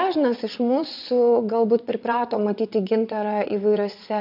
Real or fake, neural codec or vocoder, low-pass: fake; vocoder, 22.05 kHz, 80 mel bands, WaveNeXt; 5.4 kHz